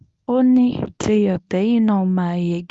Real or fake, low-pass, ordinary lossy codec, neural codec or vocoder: fake; none; none; codec, 24 kHz, 0.9 kbps, WavTokenizer, medium speech release version 1